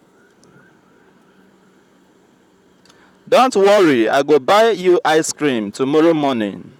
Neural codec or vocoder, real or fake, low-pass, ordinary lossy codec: vocoder, 44.1 kHz, 128 mel bands, Pupu-Vocoder; fake; 19.8 kHz; none